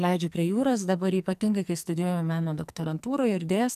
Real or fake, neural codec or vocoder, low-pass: fake; codec, 44.1 kHz, 2.6 kbps, SNAC; 14.4 kHz